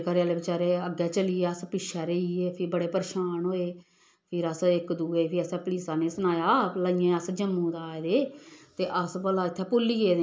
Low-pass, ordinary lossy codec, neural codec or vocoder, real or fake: none; none; none; real